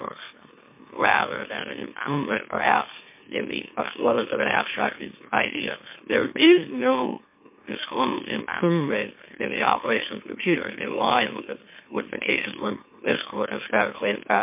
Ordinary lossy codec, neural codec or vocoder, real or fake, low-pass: MP3, 24 kbps; autoencoder, 44.1 kHz, a latent of 192 numbers a frame, MeloTTS; fake; 3.6 kHz